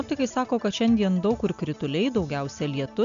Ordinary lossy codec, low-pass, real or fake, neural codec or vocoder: MP3, 64 kbps; 7.2 kHz; real; none